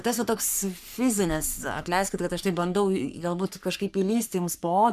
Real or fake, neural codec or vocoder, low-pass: fake; codec, 44.1 kHz, 3.4 kbps, Pupu-Codec; 14.4 kHz